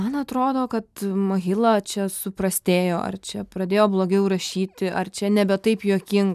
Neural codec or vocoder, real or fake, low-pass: none; real; 14.4 kHz